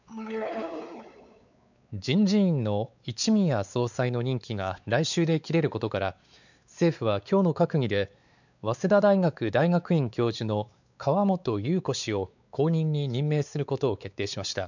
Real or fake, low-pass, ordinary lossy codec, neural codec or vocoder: fake; 7.2 kHz; none; codec, 16 kHz, 4 kbps, X-Codec, WavLM features, trained on Multilingual LibriSpeech